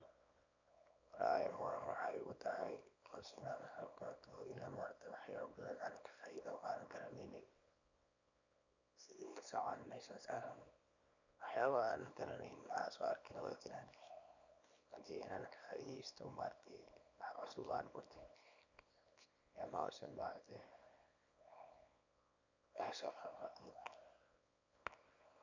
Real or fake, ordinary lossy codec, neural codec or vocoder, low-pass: fake; none; codec, 24 kHz, 0.9 kbps, WavTokenizer, small release; 7.2 kHz